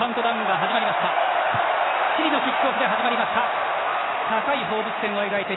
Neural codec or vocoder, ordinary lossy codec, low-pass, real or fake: none; AAC, 16 kbps; 7.2 kHz; real